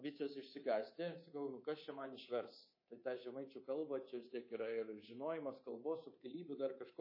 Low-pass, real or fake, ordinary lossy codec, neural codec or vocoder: 5.4 kHz; fake; MP3, 24 kbps; codec, 24 kHz, 3.1 kbps, DualCodec